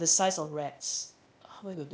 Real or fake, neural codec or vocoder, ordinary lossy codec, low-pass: fake; codec, 16 kHz, 0.8 kbps, ZipCodec; none; none